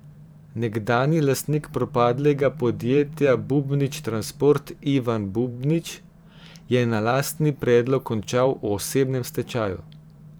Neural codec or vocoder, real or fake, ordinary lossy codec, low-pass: vocoder, 44.1 kHz, 128 mel bands every 512 samples, BigVGAN v2; fake; none; none